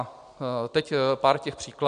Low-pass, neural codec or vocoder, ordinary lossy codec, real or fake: 9.9 kHz; none; AAC, 96 kbps; real